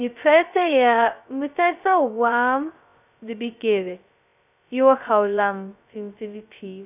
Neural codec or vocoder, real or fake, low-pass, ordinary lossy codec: codec, 16 kHz, 0.2 kbps, FocalCodec; fake; 3.6 kHz; none